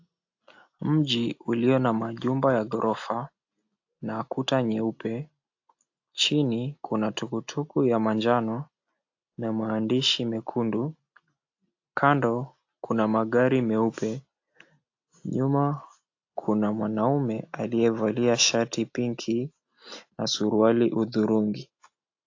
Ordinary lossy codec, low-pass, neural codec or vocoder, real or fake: AAC, 48 kbps; 7.2 kHz; none; real